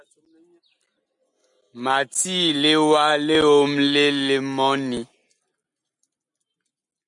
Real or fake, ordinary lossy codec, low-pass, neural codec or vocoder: real; MP3, 96 kbps; 10.8 kHz; none